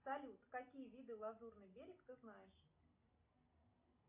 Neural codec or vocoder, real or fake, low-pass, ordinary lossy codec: none; real; 3.6 kHz; MP3, 32 kbps